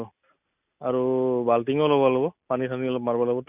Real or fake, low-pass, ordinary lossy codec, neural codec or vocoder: real; 3.6 kHz; none; none